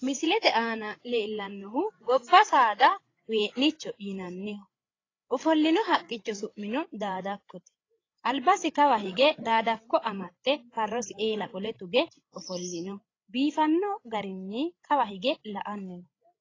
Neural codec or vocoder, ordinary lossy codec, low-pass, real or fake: codec, 16 kHz, 8 kbps, FreqCodec, larger model; AAC, 32 kbps; 7.2 kHz; fake